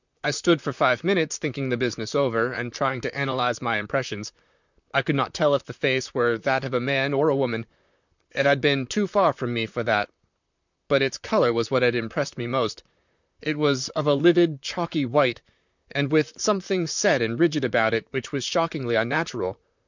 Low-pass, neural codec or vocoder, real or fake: 7.2 kHz; vocoder, 44.1 kHz, 128 mel bands, Pupu-Vocoder; fake